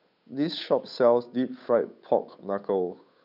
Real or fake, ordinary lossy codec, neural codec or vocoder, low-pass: fake; none; codec, 16 kHz, 8 kbps, FunCodec, trained on Chinese and English, 25 frames a second; 5.4 kHz